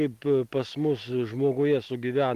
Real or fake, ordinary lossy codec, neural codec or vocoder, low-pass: real; Opus, 24 kbps; none; 14.4 kHz